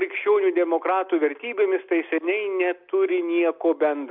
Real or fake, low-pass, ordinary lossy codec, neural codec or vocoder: real; 5.4 kHz; MP3, 48 kbps; none